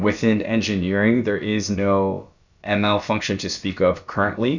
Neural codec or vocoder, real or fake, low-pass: codec, 16 kHz, about 1 kbps, DyCAST, with the encoder's durations; fake; 7.2 kHz